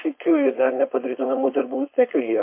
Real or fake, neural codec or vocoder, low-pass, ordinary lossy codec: fake; codec, 16 kHz, 4.8 kbps, FACodec; 3.6 kHz; MP3, 24 kbps